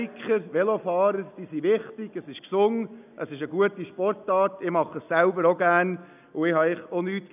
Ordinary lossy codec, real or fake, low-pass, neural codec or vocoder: none; real; 3.6 kHz; none